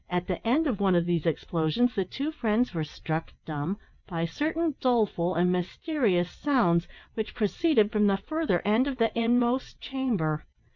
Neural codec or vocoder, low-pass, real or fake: vocoder, 22.05 kHz, 80 mel bands, WaveNeXt; 7.2 kHz; fake